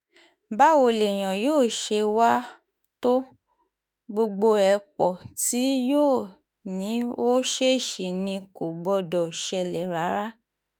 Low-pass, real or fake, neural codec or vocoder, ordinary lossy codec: none; fake; autoencoder, 48 kHz, 32 numbers a frame, DAC-VAE, trained on Japanese speech; none